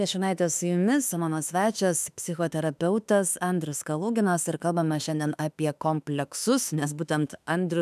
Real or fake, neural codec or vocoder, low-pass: fake; autoencoder, 48 kHz, 32 numbers a frame, DAC-VAE, trained on Japanese speech; 14.4 kHz